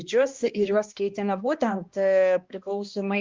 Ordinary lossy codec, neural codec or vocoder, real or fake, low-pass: Opus, 32 kbps; codec, 16 kHz, 1 kbps, X-Codec, HuBERT features, trained on balanced general audio; fake; 7.2 kHz